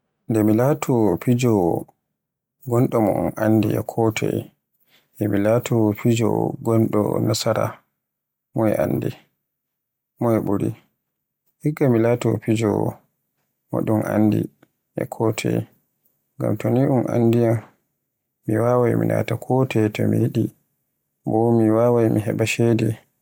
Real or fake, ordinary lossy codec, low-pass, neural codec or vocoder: real; MP3, 96 kbps; 19.8 kHz; none